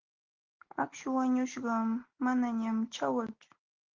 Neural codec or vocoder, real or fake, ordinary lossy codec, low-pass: none; real; Opus, 16 kbps; 7.2 kHz